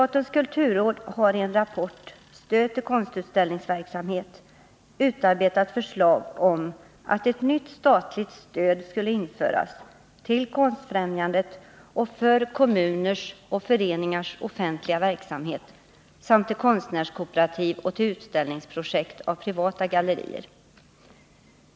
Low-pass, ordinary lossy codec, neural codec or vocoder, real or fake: none; none; none; real